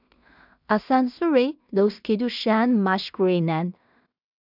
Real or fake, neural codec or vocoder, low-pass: fake; codec, 16 kHz in and 24 kHz out, 0.4 kbps, LongCat-Audio-Codec, two codebook decoder; 5.4 kHz